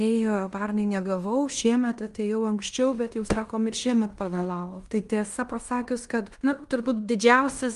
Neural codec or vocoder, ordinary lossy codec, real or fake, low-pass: codec, 16 kHz in and 24 kHz out, 0.9 kbps, LongCat-Audio-Codec, fine tuned four codebook decoder; Opus, 32 kbps; fake; 10.8 kHz